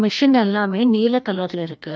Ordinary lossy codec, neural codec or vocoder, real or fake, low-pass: none; codec, 16 kHz, 1 kbps, FreqCodec, larger model; fake; none